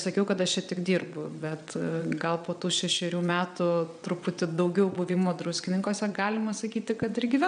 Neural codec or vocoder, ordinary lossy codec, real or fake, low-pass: vocoder, 22.05 kHz, 80 mel bands, WaveNeXt; AAC, 96 kbps; fake; 9.9 kHz